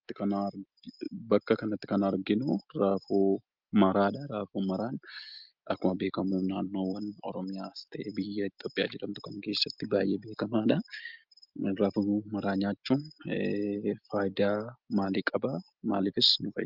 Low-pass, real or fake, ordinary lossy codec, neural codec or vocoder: 5.4 kHz; real; Opus, 24 kbps; none